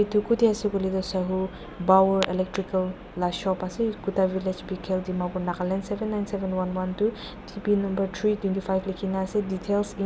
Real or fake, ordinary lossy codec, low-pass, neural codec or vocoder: real; none; none; none